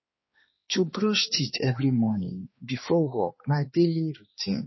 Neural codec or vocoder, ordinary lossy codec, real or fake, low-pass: codec, 16 kHz, 2 kbps, X-Codec, HuBERT features, trained on balanced general audio; MP3, 24 kbps; fake; 7.2 kHz